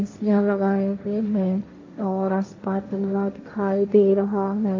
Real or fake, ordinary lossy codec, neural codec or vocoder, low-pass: fake; AAC, 32 kbps; codec, 16 kHz, 1.1 kbps, Voila-Tokenizer; 7.2 kHz